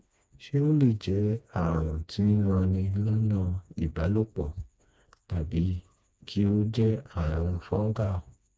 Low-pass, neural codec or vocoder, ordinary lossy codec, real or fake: none; codec, 16 kHz, 2 kbps, FreqCodec, smaller model; none; fake